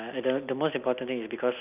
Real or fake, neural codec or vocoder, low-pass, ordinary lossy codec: real; none; 3.6 kHz; none